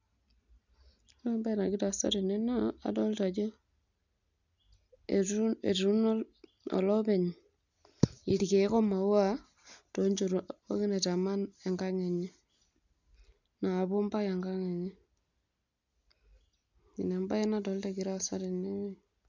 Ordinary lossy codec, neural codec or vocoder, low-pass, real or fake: none; none; 7.2 kHz; real